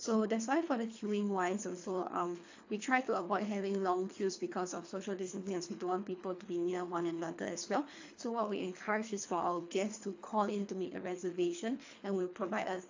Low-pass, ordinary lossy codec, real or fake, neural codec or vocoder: 7.2 kHz; AAC, 48 kbps; fake; codec, 24 kHz, 3 kbps, HILCodec